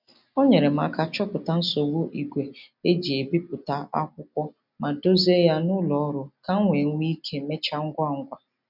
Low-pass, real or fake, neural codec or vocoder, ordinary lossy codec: 5.4 kHz; real; none; none